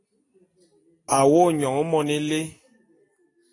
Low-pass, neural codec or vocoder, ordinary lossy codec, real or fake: 10.8 kHz; none; AAC, 32 kbps; real